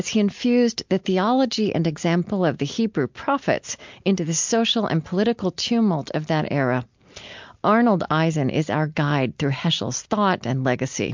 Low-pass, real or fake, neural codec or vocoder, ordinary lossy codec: 7.2 kHz; real; none; MP3, 64 kbps